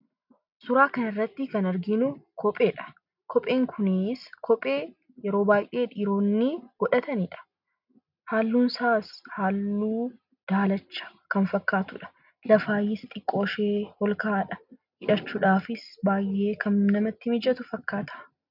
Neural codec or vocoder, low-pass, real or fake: none; 5.4 kHz; real